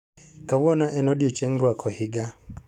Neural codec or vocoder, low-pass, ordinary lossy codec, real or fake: codec, 44.1 kHz, 7.8 kbps, DAC; 19.8 kHz; none; fake